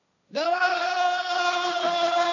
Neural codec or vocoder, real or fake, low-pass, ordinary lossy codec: codec, 16 kHz, 1.1 kbps, Voila-Tokenizer; fake; 7.2 kHz; none